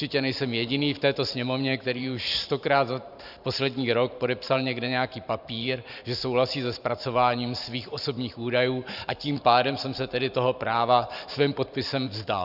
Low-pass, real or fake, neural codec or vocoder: 5.4 kHz; fake; vocoder, 44.1 kHz, 128 mel bands every 512 samples, BigVGAN v2